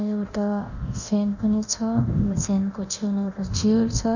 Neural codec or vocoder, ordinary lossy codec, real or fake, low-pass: codec, 24 kHz, 0.9 kbps, DualCodec; none; fake; 7.2 kHz